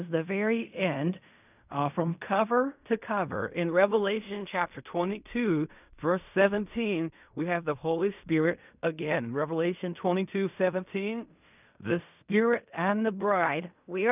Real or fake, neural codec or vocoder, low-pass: fake; codec, 16 kHz in and 24 kHz out, 0.4 kbps, LongCat-Audio-Codec, fine tuned four codebook decoder; 3.6 kHz